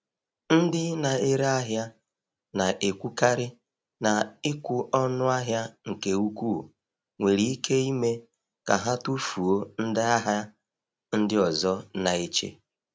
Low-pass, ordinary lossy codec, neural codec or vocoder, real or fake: none; none; none; real